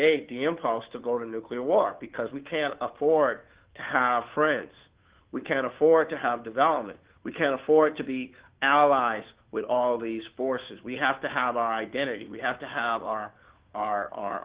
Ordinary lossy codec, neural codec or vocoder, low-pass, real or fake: Opus, 16 kbps; codec, 16 kHz, 2 kbps, FunCodec, trained on Chinese and English, 25 frames a second; 3.6 kHz; fake